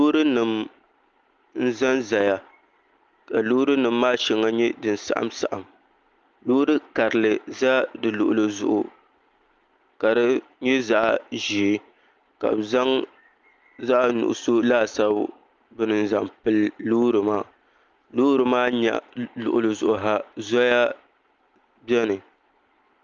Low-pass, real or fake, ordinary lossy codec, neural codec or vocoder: 7.2 kHz; real; Opus, 24 kbps; none